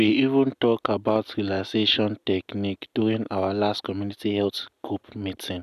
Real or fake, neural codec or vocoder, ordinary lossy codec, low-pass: real; none; none; 14.4 kHz